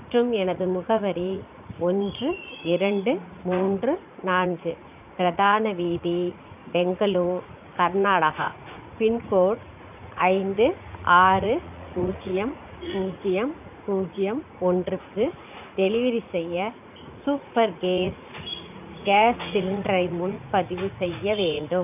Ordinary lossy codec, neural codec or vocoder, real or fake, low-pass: none; vocoder, 44.1 kHz, 80 mel bands, Vocos; fake; 3.6 kHz